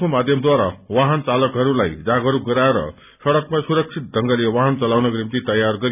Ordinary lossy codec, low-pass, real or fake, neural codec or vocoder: none; 3.6 kHz; real; none